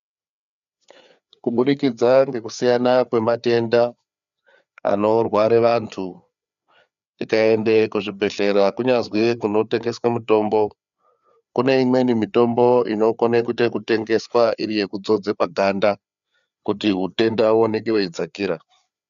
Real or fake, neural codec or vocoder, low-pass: fake; codec, 16 kHz, 4 kbps, FreqCodec, larger model; 7.2 kHz